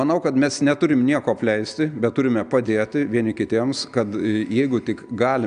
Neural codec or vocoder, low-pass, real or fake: none; 9.9 kHz; real